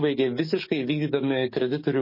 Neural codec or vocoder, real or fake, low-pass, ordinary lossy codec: codec, 44.1 kHz, 7.8 kbps, DAC; fake; 5.4 kHz; MP3, 24 kbps